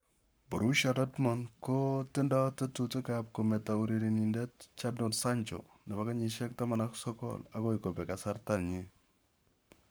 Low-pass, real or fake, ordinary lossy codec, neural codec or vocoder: none; fake; none; codec, 44.1 kHz, 7.8 kbps, Pupu-Codec